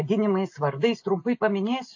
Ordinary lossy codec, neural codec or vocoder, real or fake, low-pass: AAC, 48 kbps; none; real; 7.2 kHz